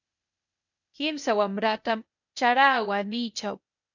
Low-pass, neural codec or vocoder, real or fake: 7.2 kHz; codec, 16 kHz, 0.8 kbps, ZipCodec; fake